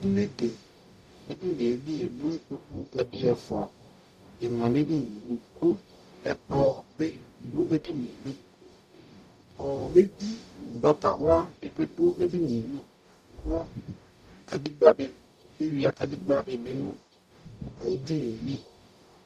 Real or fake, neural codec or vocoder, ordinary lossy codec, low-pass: fake; codec, 44.1 kHz, 0.9 kbps, DAC; Opus, 64 kbps; 14.4 kHz